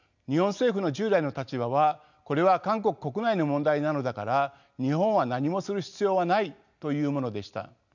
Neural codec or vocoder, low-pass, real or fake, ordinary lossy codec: none; 7.2 kHz; real; none